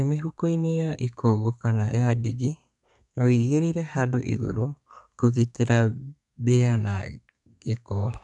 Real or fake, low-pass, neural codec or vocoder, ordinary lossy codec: fake; 10.8 kHz; codec, 32 kHz, 1.9 kbps, SNAC; none